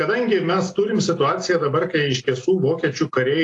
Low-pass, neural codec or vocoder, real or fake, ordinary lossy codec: 10.8 kHz; none; real; AAC, 48 kbps